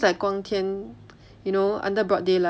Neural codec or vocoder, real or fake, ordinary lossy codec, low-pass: none; real; none; none